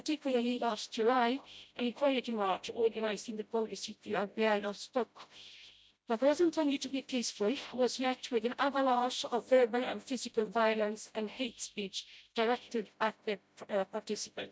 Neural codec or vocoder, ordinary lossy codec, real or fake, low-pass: codec, 16 kHz, 0.5 kbps, FreqCodec, smaller model; none; fake; none